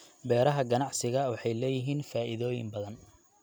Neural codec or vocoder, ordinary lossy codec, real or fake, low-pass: none; none; real; none